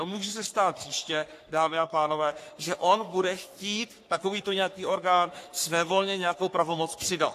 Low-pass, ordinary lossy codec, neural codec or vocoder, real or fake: 14.4 kHz; AAC, 64 kbps; codec, 44.1 kHz, 3.4 kbps, Pupu-Codec; fake